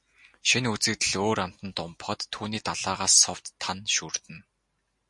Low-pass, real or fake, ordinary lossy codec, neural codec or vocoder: 10.8 kHz; real; MP3, 48 kbps; none